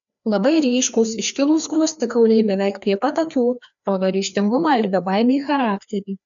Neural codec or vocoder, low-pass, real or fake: codec, 16 kHz, 2 kbps, FreqCodec, larger model; 7.2 kHz; fake